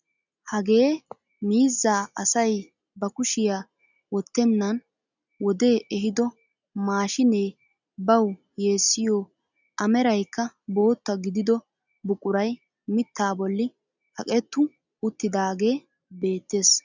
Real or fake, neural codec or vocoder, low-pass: real; none; 7.2 kHz